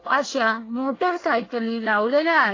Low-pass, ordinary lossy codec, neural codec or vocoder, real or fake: 7.2 kHz; AAC, 32 kbps; codec, 24 kHz, 1 kbps, SNAC; fake